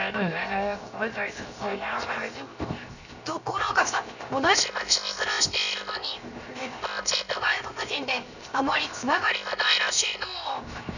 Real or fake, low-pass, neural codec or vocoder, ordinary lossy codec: fake; 7.2 kHz; codec, 16 kHz, 0.7 kbps, FocalCodec; none